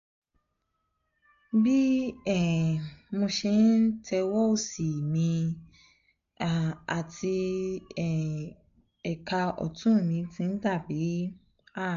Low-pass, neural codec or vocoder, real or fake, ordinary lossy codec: 7.2 kHz; none; real; MP3, 64 kbps